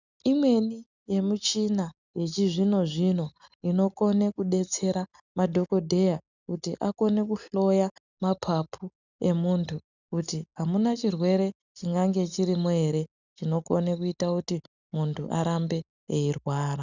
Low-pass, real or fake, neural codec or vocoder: 7.2 kHz; real; none